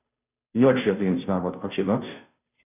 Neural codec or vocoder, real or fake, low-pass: codec, 16 kHz, 0.5 kbps, FunCodec, trained on Chinese and English, 25 frames a second; fake; 3.6 kHz